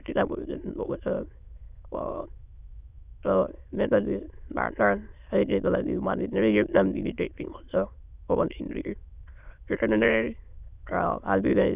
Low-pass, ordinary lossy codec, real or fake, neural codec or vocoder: 3.6 kHz; none; fake; autoencoder, 22.05 kHz, a latent of 192 numbers a frame, VITS, trained on many speakers